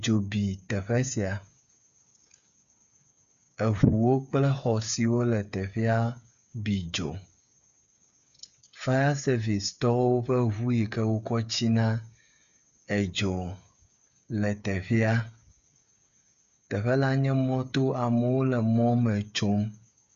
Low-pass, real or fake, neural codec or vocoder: 7.2 kHz; fake; codec, 16 kHz, 8 kbps, FreqCodec, smaller model